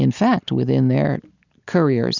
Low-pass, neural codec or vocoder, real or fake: 7.2 kHz; none; real